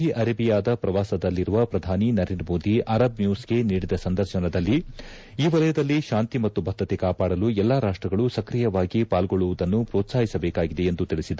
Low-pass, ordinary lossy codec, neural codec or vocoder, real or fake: none; none; none; real